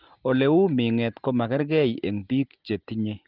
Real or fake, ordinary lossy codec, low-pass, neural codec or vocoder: real; Opus, 24 kbps; 5.4 kHz; none